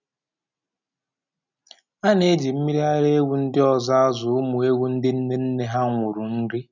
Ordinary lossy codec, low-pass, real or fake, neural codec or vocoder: none; 7.2 kHz; real; none